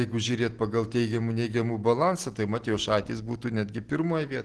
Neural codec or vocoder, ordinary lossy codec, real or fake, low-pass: none; Opus, 16 kbps; real; 10.8 kHz